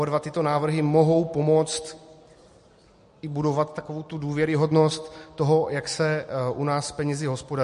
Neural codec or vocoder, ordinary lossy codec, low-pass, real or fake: none; MP3, 48 kbps; 14.4 kHz; real